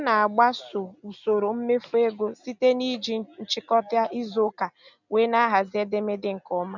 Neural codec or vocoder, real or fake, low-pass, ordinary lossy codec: none; real; 7.2 kHz; none